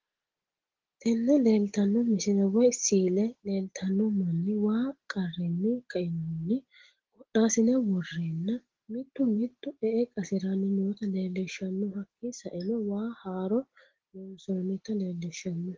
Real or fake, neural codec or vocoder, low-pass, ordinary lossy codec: real; none; 7.2 kHz; Opus, 16 kbps